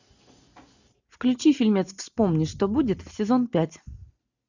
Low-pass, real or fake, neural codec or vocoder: 7.2 kHz; real; none